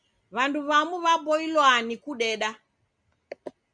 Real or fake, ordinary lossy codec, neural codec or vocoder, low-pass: real; Opus, 64 kbps; none; 9.9 kHz